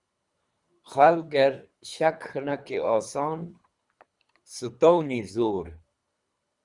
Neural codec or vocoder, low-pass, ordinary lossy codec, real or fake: codec, 24 kHz, 3 kbps, HILCodec; 10.8 kHz; Opus, 64 kbps; fake